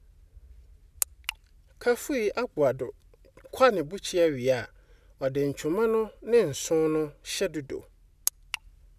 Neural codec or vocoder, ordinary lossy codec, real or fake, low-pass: none; none; real; 14.4 kHz